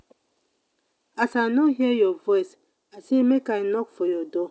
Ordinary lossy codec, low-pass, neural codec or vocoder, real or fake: none; none; none; real